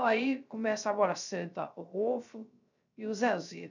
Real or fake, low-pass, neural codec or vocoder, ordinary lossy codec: fake; 7.2 kHz; codec, 16 kHz, 0.3 kbps, FocalCodec; none